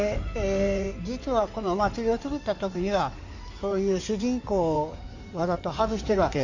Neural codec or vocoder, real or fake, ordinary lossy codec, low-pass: codec, 16 kHz in and 24 kHz out, 2.2 kbps, FireRedTTS-2 codec; fake; none; 7.2 kHz